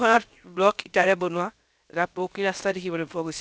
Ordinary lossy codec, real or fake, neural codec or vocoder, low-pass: none; fake; codec, 16 kHz, 0.7 kbps, FocalCodec; none